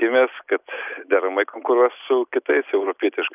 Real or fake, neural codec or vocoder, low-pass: real; none; 3.6 kHz